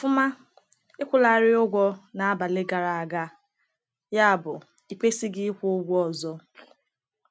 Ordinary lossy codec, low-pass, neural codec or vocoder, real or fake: none; none; none; real